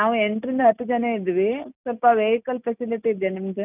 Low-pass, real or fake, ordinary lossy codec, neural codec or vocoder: 3.6 kHz; real; none; none